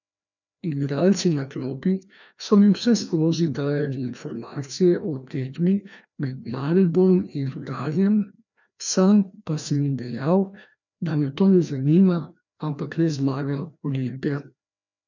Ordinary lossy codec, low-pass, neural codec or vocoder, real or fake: none; 7.2 kHz; codec, 16 kHz, 1 kbps, FreqCodec, larger model; fake